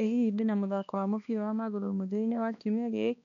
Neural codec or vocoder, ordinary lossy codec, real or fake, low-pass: codec, 16 kHz, 2 kbps, X-Codec, HuBERT features, trained on balanced general audio; none; fake; 7.2 kHz